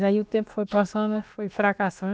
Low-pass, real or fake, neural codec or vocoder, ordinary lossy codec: none; fake; codec, 16 kHz, about 1 kbps, DyCAST, with the encoder's durations; none